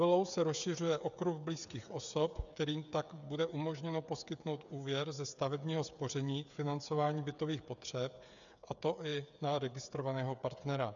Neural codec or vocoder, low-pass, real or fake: codec, 16 kHz, 8 kbps, FreqCodec, smaller model; 7.2 kHz; fake